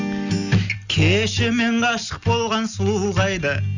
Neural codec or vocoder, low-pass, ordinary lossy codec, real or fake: none; 7.2 kHz; none; real